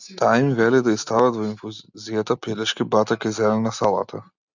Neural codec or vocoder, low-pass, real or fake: none; 7.2 kHz; real